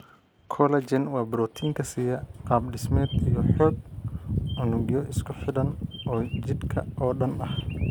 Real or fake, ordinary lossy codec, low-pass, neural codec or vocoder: real; none; none; none